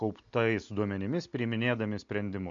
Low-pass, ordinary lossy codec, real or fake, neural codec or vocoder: 7.2 kHz; AAC, 64 kbps; real; none